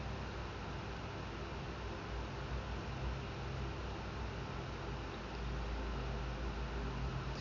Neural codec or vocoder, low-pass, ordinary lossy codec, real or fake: none; 7.2 kHz; none; real